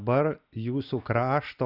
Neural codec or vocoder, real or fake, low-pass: none; real; 5.4 kHz